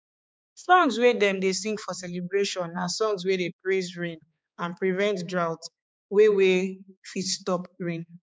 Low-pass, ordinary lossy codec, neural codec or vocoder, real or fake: none; none; codec, 16 kHz, 4 kbps, X-Codec, HuBERT features, trained on balanced general audio; fake